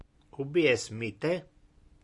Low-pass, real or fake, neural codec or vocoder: 10.8 kHz; real; none